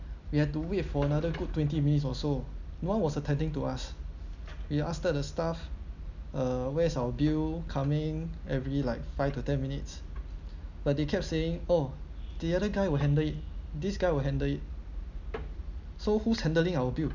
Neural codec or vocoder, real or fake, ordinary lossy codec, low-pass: none; real; none; 7.2 kHz